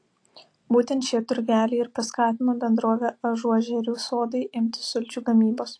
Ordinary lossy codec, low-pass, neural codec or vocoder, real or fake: AAC, 48 kbps; 9.9 kHz; none; real